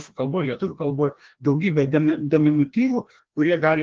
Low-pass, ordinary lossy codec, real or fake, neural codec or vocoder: 7.2 kHz; Opus, 16 kbps; fake; codec, 16 kHz, 1 kbps, FreqCodec, larger model